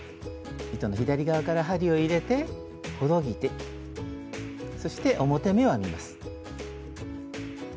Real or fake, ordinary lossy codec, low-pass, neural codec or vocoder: real; none; none; none